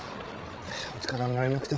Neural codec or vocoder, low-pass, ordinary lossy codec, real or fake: codec, 16 kHz, 16 kbps, FreqCodec, larger model; none; none; fake